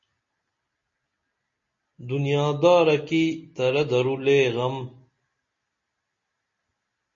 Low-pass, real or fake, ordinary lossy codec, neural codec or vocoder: 7.2 kHz; real; MP3, 32 kbps; none